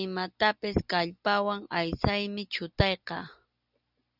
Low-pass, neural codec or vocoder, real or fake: 5.4 kHz; none; real